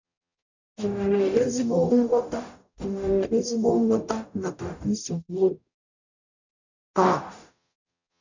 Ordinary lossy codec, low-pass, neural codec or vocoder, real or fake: none; 7.2 kHz; codec, 44.1 kHz, 0.9 kbps, DAC; fake